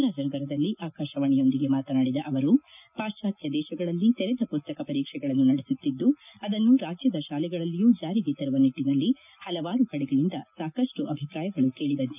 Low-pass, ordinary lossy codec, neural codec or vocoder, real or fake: 3.6 kHz; none; none; real